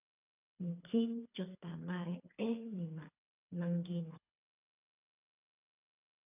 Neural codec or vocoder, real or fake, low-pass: codec, 24 kHz, 3 kbps, HILCodec; fake; 3.6 kHz